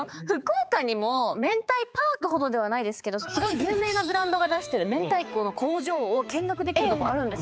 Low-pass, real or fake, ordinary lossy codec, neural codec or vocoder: none; fake; none; codec, 16 kHz, 4 kbps, X-Codec, HuBERT features, trained on balanced general audio